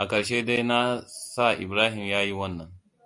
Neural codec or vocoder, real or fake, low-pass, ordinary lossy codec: none; real; 10.8 kHz; MP3, 96 kbps